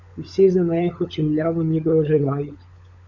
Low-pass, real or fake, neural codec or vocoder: 7.2 kHz; fake; codec, 16 kHz, 16 kbps, FunCodec, trained on LibriTTS, 50 frames a second